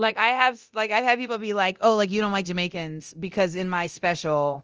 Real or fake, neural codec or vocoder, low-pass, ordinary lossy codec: fake; codec, 24 kHz, 0.9 kbps, DualCodec; 7.2 kHz; Opus, 24 kbps